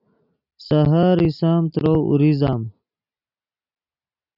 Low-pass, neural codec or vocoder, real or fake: 5.4 kHz; none; real